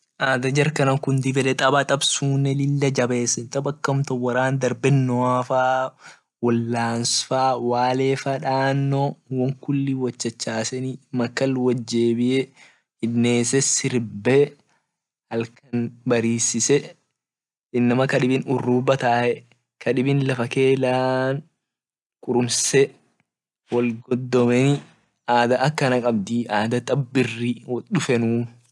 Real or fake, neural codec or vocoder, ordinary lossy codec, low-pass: real; none; none; none